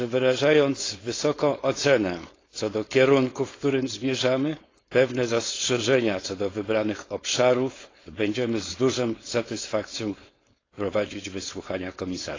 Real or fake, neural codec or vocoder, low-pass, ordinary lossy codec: fake; codec, 16 kHz, 4.8 kbps, FACodec; 7.2 kHz; AAC, 32 kbps